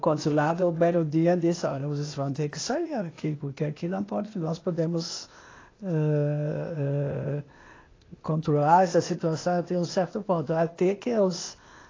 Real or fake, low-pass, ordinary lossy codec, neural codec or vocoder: fake; 7.2 kHz; AAC, 32 kbps; codec, 16 kHz, 0.8 kbps, ZipCodec